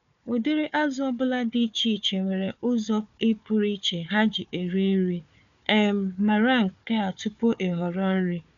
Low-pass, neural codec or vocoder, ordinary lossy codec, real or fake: 7.2 kHz; codec, 16 kHz, 4 kbps, FunCodec, trained on Chinese and English, 50 frames a second; none; fake